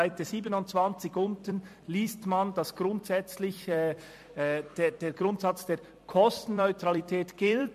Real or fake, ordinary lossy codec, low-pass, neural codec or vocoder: fake; MP3, 64 kbps; 14.4 kHz; vocoder, 44.1 kHz, 128 mel bands every 256 samples, BigVGAN v2